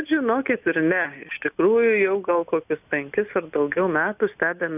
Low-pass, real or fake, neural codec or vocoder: 3.6 kHz; real; none